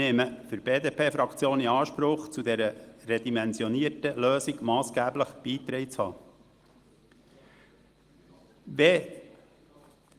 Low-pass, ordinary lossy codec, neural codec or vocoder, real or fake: 14.4 kHz; Opus, 24 kbps; none; real